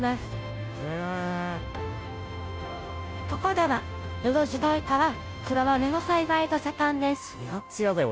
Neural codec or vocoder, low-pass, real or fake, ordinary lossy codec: codec, 16 kHz, 0.5 kbps, FunCodec, trained on Chinese and English, 25 frames a second; none; fake; none